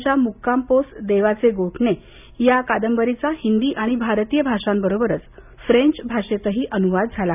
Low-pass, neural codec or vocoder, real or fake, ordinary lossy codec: 3.6 kHz; none; real; none